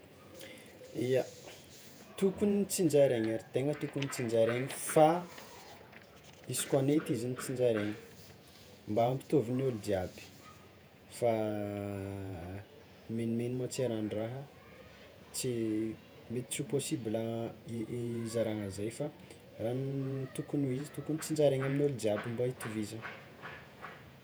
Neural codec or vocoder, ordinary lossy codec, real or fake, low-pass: vocoder, 48 kHz, 128 mel bands, Vocos; none; fake; none